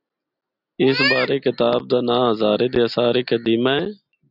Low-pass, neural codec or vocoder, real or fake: 5.4 kHz; none; real